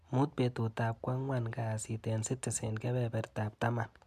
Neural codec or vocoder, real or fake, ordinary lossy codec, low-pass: none; real; MP3, 96 kbps; 14.4 kHz